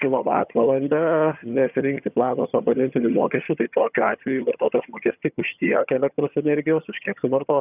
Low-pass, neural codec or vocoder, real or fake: 3.6 kHz; vocoder, 22.05 kHz, 80 mel bands, HiFi-GAN; fake